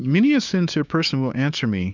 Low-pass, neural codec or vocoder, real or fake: 7.2 kHz; codec, 16 kHz, 6 kbps, DAC; fake